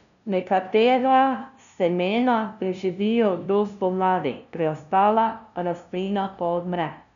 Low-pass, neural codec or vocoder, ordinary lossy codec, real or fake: 7.2 kHz; codec, 16 kHz, 0.5 kbps, FunCodec, trained on LibriTTS, 25 frames a second; none; fake